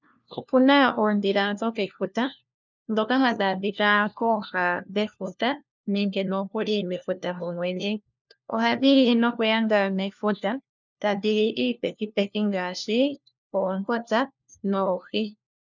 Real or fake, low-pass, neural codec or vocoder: fake; 7.2 kHz; codec, 16 kHz, 1 kbps, FunCodec, trained on LibriTTS, 50 frames a second